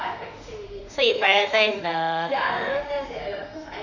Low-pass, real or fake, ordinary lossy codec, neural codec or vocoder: 7.2 kHz; fake; none; autoencoder, 48 kHz, 32 numbers a frame, DAC-VAE, trained on Japanese speech